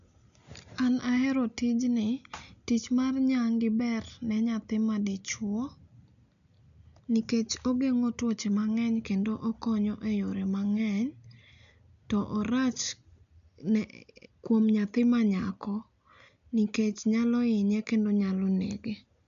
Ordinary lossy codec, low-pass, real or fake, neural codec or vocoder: none; 7.2 kHz; real; none